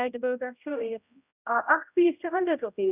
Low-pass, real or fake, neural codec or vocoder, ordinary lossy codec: 3.6 kHz; fake; codec, 16 kHz, 0.5 kbps, X-Codec, HuBERT features, trained on general audio; none